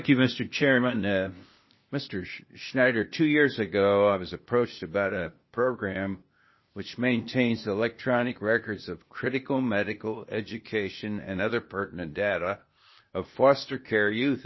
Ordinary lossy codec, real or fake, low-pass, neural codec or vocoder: MP3, 24 kbps; fake; 7.2 kHz; codec, 16 kHz, 0.8 kbps, ZipCodec